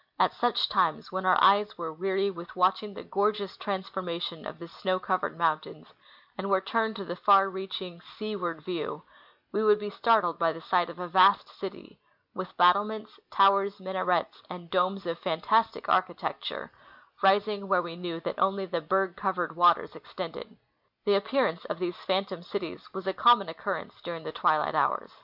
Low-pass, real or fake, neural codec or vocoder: 5.4 kHz; real; none